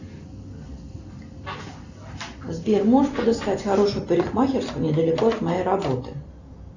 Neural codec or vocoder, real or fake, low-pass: none; real; 7.2 kHz